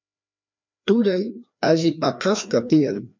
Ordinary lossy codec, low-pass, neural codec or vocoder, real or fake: MP3, 64 kbps; 7.2 kHz; codec, 16 kHz, 2 kbps, FreqCodec, larger model; fake